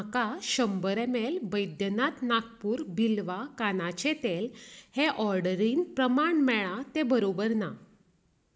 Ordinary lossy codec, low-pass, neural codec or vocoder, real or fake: none; none; none; real